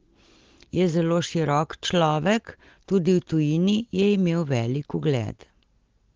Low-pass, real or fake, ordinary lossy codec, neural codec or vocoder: 7.2 kHz; real; Opus, 16 kbps; none